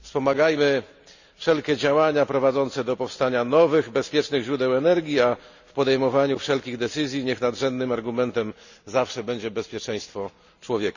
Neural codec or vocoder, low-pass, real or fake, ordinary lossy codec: none; 7.2 kHz; real; none